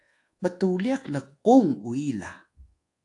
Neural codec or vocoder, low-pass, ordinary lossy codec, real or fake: codec, 24 kHz, 1.2 kbps, DualCodec; 10.8 kHz; AAC, 48 kbps; fake